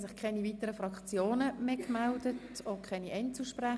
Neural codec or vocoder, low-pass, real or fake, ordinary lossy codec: none; none; real; none